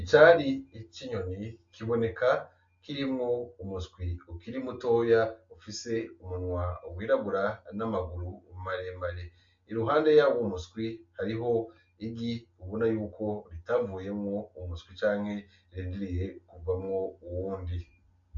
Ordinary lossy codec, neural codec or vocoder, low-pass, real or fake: MP3, 48 kbps; none; 7.2 kHz; real